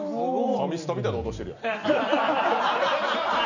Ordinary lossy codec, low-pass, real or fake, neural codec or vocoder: none; 7.2 kHz; real; none